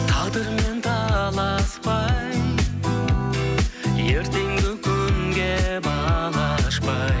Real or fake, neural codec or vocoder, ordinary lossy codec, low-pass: real; none; none; none